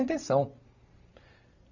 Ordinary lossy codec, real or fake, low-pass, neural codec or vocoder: none; fake; 7.2 kHz; vocoder, 44.1 kHz, 128 mel bands every 512 samples, BigVGAN v2